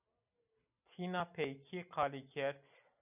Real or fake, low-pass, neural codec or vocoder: real; 3.6 kHz; none